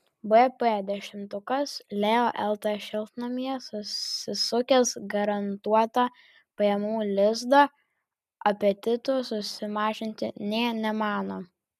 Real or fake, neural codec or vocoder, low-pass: real; none; 14.4 kHz